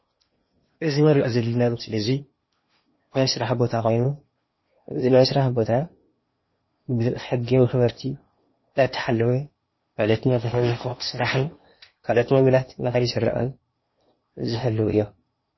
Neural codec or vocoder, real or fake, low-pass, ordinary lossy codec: codec, 16 kHz in and 24 kHz out, 0.8 kbps, FocalCodec, streaming, 65536 codes; fake; 7.2 kHz; MP3, 24 kbps